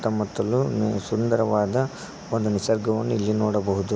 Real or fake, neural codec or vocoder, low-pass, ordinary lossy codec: real; none; none; none